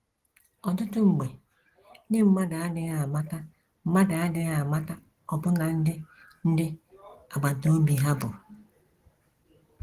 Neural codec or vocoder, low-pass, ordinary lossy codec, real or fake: none; 14.4 kHz; Opus, 16 kbps; real